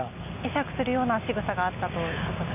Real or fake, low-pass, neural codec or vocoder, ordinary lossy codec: real; 3.6 kHz; none; none